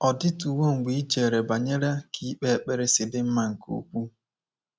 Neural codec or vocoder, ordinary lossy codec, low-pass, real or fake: none; none; none; real